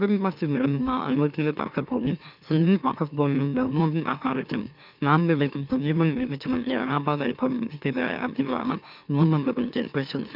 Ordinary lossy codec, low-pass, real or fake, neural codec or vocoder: none; 5.4 kHz; fake; autoencoder, 44.1 kHz, a latent of 192 numbers a frame, MeloTTS